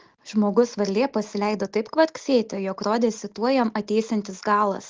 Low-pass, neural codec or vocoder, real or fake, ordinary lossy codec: 7.2 kHz; none; real; Opus, 16 kbps